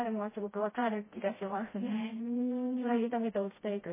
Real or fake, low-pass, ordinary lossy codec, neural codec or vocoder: fake; 3.6 kHz; MP3, 16 kbps; codec, 16 kHz, 1 kbps, FreqCodec, smaller model